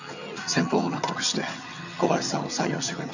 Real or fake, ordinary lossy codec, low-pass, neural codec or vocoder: fake; none; 7.2 kHz; vocoder, 22.05 kHz, 80 mel bands, HiFi-GAN